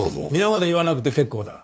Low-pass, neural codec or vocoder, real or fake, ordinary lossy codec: none; codec, 16 kHz, 2 kbps, FunCodec, trained on LibriTTS, 25 frames a second; fake; none